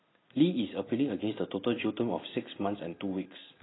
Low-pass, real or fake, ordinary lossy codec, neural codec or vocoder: 7.2 kHz; real; AAC, 16 kbps; none